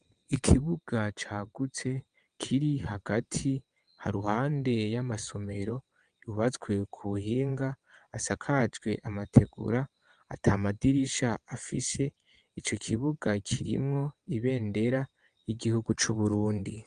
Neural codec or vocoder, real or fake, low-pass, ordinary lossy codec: vocoder, 22.05 kHz, 80 mel bands, WaveNeXt; fake; 9.9 kHz; Opus, 32 kbps